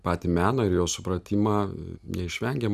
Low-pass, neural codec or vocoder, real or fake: 14.4 kHz; none; real